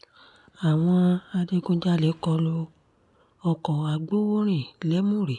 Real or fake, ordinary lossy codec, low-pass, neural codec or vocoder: real; none; 10.8 kHz; none